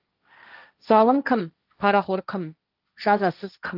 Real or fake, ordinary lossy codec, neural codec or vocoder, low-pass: fake; Opus, 24 kbps; codec, 16 kHz, 1.1 kbps, Voila-Tokenizer; 5.4 kHz